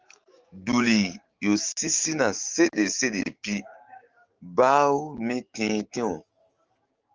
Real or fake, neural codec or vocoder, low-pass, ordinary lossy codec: fake; codec, 16 kHz, 6 kbps, DAC; 7.2 kHz; Opus, 24 kbps